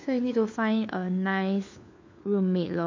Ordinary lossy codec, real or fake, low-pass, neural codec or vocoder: MP3, 64 kbps; fake; 7.2 kHz; codec, 16 kHz, 4 kbps, FunCodec, trained on LibriTTS, 50 frames a second